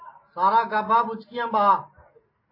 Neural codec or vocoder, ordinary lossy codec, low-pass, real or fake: none; MP3, 24 kbps; 5.4 kHz; real